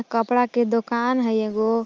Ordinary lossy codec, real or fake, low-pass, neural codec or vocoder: Opus, 24 kbps; real; 7.2 kHz; none